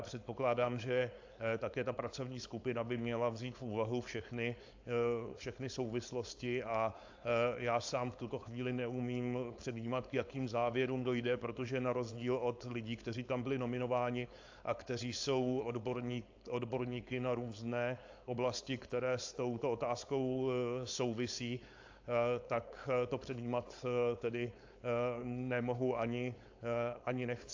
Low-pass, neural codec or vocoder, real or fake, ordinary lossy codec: 7.2 kHz; codec, 16 kHz, 4.8 kbps, FACodec; fake; AAC, 48 kbps